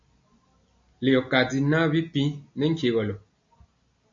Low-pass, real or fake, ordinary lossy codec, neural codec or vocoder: 7.2 kHz; real; MP3, 48 kbps; none